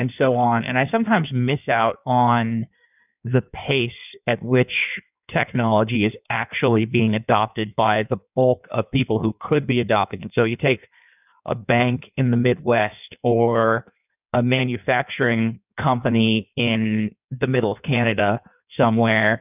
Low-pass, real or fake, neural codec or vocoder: 3.6 kHz; fake; codec, 16 kHz in and 24 kHz out, 1.1 kbps, FireRedTTS-2 codec